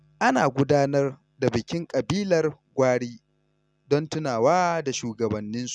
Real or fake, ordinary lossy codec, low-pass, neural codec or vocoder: real; none; none; none